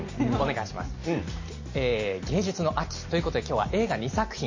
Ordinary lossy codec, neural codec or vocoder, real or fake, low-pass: MP3, 32 kbps; none; real; 7.2 kHz